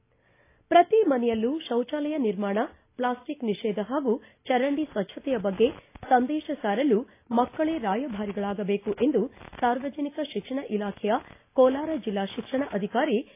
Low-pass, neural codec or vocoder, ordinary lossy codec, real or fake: 3.6 kHz; none; AAC, 24 kbps; real